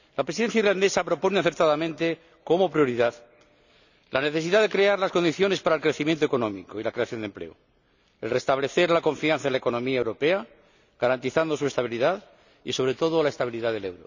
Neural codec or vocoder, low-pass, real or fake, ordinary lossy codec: none; 7.2 kHz; real; none